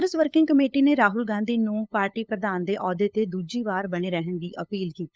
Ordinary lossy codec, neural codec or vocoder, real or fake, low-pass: none; codec, 16 kHz, 8 kbps, FunCodec, trained on LibriTTS, 25 frames a second; fake; none